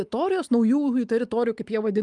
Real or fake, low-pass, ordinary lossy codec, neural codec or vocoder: real; 10.8 kHz; Opus, 32 kbps; none